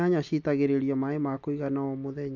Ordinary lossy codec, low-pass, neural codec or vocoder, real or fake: none; 7.2 kHz; none; real